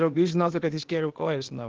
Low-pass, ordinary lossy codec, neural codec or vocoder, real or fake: 7.2 kHz; Opus, 16 kbps; codec, 16 kHz, 0.8 kbps, ZipCodec; fake